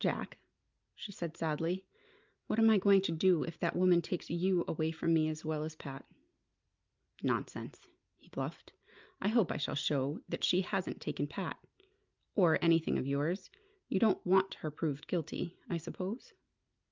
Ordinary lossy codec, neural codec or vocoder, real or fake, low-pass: Opus, 24 kbps; none; real; 7.2 kHz